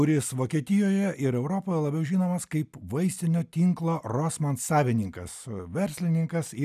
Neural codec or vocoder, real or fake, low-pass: none; real; 14.4 kHz